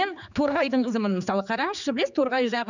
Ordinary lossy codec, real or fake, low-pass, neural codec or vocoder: none; fake; 7.2 kHz; codec, 16 kHz, 4 kbps, X-Codec, HuBERT features, trained on general audio